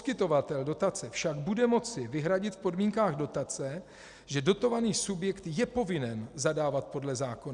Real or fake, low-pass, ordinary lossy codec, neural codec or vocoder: real; 10.8 kHz; Opus, 64 kbps; none